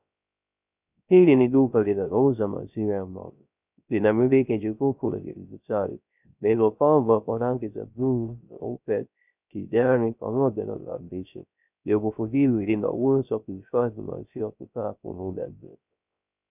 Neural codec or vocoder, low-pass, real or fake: codec, 16 kHz, 0.3 kbps, FocalCodec; 3.6 kHz; fake